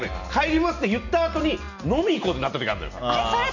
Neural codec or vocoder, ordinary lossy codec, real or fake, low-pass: none; none; real; 7.2 kHz